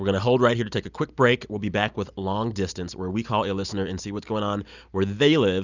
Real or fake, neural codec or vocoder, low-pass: real; none; 7.2 kHz